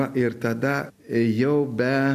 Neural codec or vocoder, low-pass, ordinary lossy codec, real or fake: none; 14.4 kHz; MP3, 96 kbps; real